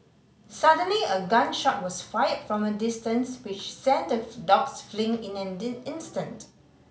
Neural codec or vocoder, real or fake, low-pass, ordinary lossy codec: none; real; none; none